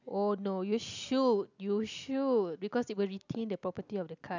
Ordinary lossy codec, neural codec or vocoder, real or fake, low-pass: none; none; real; 7.2 kHz